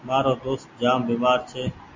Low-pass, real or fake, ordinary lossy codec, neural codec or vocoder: 7.2 kHz; real; MP3, 48 kbps; none